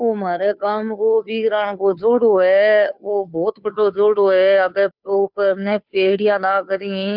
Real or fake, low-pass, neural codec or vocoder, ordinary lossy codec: fake; 5.4 kHz; codec, 16 kHz, 2 kbps, FunCodec, trained on Chinese and English, 25 frames a second; none